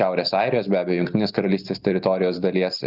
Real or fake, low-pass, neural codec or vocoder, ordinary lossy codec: real; 5.4 kHz; none; Opus, 64 kbps